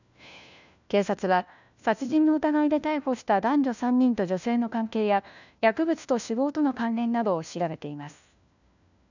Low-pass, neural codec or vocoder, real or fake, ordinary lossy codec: 7.2 kHz; codec, 16 kHz, 1 kbps, FunCodec, trained on LibriTTS, 50 frames a second; fake; none